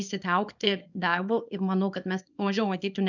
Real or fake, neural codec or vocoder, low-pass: fake; codec, 24 kHz, 0.9 kbps, WavTokenizer, small release; 7.2 kHz